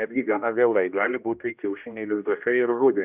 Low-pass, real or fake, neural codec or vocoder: 3.6 kHz; fake; codec, 16 kHz, 1 kbps, X-Codec, HuBERT features, trained on general audio